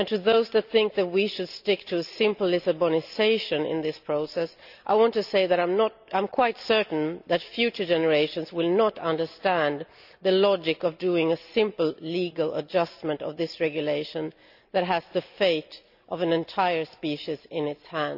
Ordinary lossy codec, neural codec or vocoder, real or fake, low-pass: none; none; real; 5.4 kHz